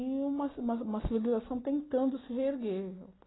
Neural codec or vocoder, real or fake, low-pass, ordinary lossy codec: none; real; 7.2 kHz; AAC, 16 kbps